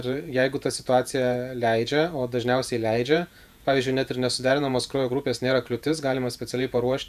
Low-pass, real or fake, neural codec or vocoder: 14.4 kHz; fake; vocoder, 48 kHz, 128 mel bands, Vocos